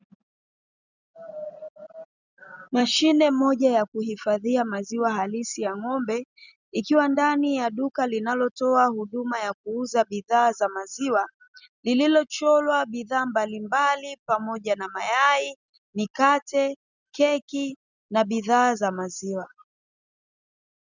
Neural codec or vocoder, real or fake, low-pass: none; real; 7.2 kHz